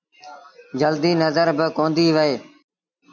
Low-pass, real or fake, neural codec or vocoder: 7.2 kHz; real; none